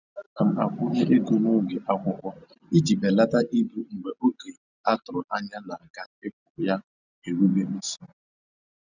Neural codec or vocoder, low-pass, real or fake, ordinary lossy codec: none; 7.2 kHz; real; none